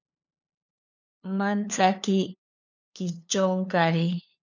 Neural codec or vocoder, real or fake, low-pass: codec, 16 kHz, 2 kbps, FunCodec, trained on LibriTTS, 25 frames a second; fake; 7.2 kHz